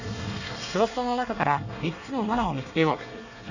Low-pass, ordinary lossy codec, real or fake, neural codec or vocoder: 7.2 kHz; none; fake; codec, 24 kHz, 1 kbps, SNAC